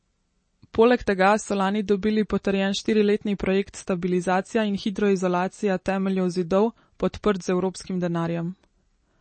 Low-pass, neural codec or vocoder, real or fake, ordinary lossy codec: 9.9 kHz; none; real; MP3, 32 kbps